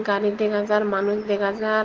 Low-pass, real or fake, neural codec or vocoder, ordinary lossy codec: 7.2 kHz; real; none; Opus, 16 kbps